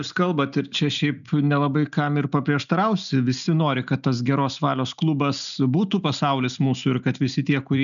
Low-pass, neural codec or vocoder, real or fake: 7.2 kHz; none; real